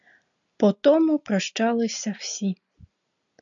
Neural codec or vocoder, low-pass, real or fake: none; 7.2 kHz; real